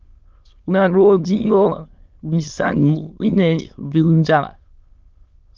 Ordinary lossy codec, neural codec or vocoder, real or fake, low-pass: Opus, 32 kbps; autoencoder, 22.05 kHz, a latent of 192 numbers a frame, VITS, trained on many speakers; fake; 7.2 kHz